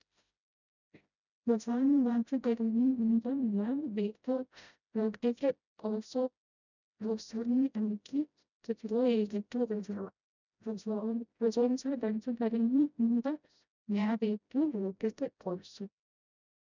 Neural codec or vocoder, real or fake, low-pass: codec, 16 kHz, 0.5 kbps, FreqCodec, smaller model; fake; 7.2 kHz